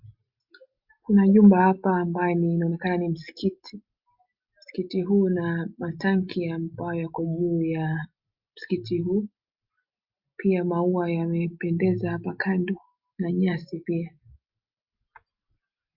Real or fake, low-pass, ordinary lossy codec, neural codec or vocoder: real; 5.4 kHz; Opus, 64 kbps; none